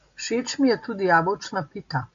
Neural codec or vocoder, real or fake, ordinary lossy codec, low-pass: none; real; AAC, 64 kbps; 7.2 kHz